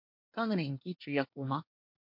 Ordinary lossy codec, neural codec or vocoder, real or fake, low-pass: MP3, 48 kbps; codec, 24 kHz, 1 kbps, SNAC; fake; 5.4 kHz